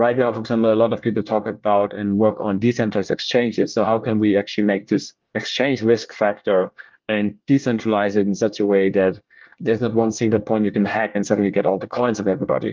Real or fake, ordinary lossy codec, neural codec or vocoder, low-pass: fake; Opus, 24 kbps; codec, 24 kHz, 1 kbps, SNAC; 7.2 kHz